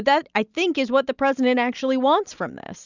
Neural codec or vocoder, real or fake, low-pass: none; real; 7.2 kHz